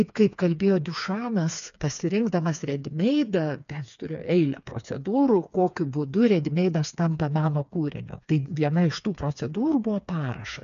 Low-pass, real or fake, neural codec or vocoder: 7.2 kHz; fake; codec, 16 kHz, 4 kbps, FreqCodec, smaller model